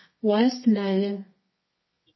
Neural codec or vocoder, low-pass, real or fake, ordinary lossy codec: codec, 24 kHz, 0.9 kbps, WavTokenizer, medium music audio release; 7.2 kHz; fake; MP3, 24 kbps